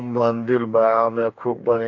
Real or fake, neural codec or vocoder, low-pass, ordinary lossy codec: fake; codec, 44.1 kHz, 2.6 kbps, DAC; 7.2 kHz; AAC, 48 kbps